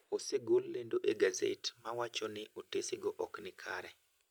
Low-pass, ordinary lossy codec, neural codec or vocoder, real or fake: none; none; none; real